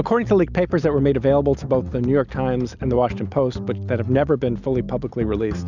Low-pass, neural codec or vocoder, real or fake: 7.2 kHz; none; real